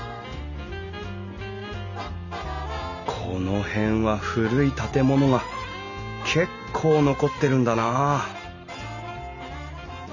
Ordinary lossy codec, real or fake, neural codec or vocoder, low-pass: none; real; none; 7.2 kHz